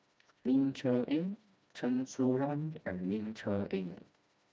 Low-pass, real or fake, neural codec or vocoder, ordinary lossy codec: none; fake; codec, 16 kHz, 1 kbps, FreqCodec, smaller model; none